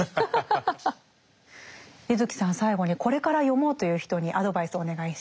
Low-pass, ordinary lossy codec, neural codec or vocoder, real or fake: none; none; none; real